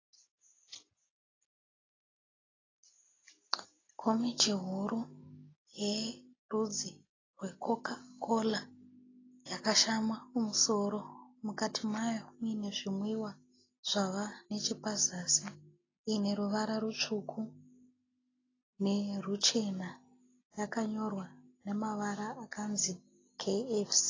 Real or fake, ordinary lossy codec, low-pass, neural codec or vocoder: real; AAC, 32 kbps; 7.2 kHz; none